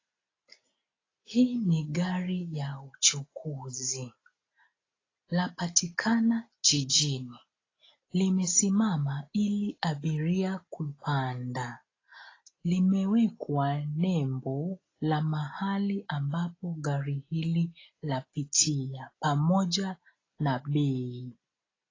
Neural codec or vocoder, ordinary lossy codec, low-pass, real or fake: none; AAC, 32 kbps; 7.2 kHz; real